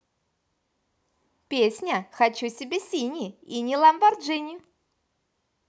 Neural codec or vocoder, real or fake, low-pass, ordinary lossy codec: none; real; none; none